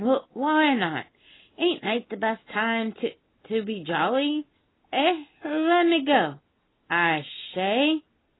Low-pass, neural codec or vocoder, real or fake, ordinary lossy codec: 7.2 kHz; none; real; AAC, 16 kbps